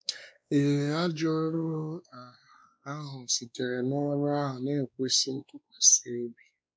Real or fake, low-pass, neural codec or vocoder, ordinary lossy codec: fake; none; codec, 16 kHz, 2 kbps, X-Codec, WavLM features, trained on Multilingual LibriSpeech; none